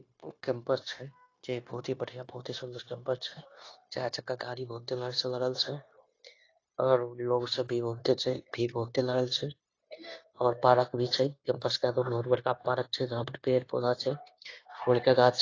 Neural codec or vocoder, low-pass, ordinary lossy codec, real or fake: codec, 16 kHz, 0.9 kbps, LongCat-Audio-Codec; 7.2 kHz; AAC, 32 kbps; fake